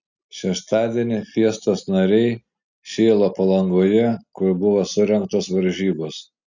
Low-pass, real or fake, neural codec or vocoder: 7.2 kHz; real; none